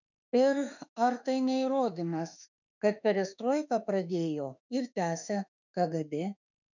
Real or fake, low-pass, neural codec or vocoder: fake; 7.2 kHz; autoencoder, 48 kHz, 32 numbers a frame, DAC-VAE, trained on Japanese speech